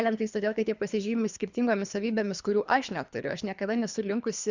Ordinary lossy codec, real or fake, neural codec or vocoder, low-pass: Opus, 64 kbps; fake; codec, 24 kHz, 3 kbps, HILCodec; 7.2 kHz